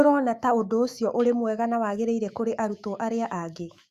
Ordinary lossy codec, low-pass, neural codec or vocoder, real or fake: Opus, 64 kbps; 14.4 kHz; autoencoder, 48 kHz, 128 numbers a frame, DAC-VAE, trained on Japanese speech; fake